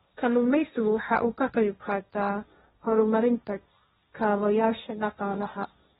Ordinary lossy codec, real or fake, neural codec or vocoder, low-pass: AAC, 16 kbps; fake; codec, 16 kHz, 1.1 kbps, Voila-Tokenizer; 7.2 kHz